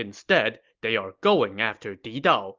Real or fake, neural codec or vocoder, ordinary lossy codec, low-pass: real; none; Opus, 32 kbps; 7.2 kHz